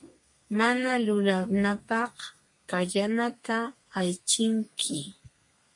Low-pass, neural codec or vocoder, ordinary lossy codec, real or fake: 10.8 kHz; codec, 32 kHz, 1.9 kbps, SNAC; MP3, 48 kbps; fake